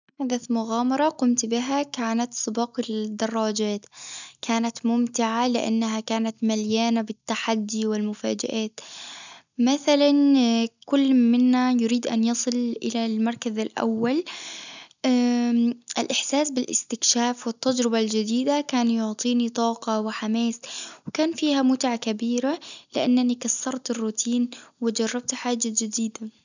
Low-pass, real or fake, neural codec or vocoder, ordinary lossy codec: 7.2 kHz; real; none; none